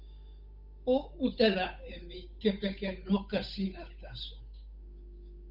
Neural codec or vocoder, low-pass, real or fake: codec, 16 kHz, 8 kbps, FunCodec, trained on Chinese and English, 25 frames a second; 5.4 kHz; fake